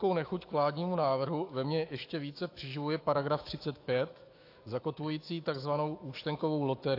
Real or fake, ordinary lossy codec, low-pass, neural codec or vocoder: fake; AAC, 32 kbps; 5.4 kHz; autoencoder, 48 kHz, 128 numbers a frame, DAC-VAE, trained on Japanese speech